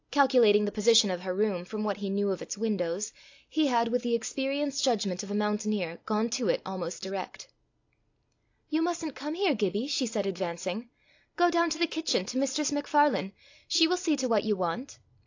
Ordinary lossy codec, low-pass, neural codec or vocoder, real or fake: AAC, 48 kbps; 7.2 kHz; none; real